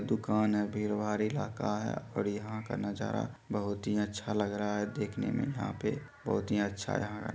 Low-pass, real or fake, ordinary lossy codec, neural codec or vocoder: none; real; none; none